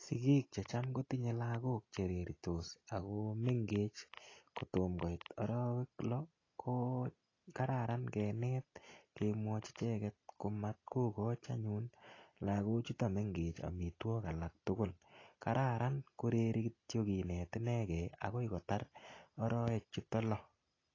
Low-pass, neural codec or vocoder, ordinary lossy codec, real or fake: 7.2 kHz; none; AAC, 32 kbps; real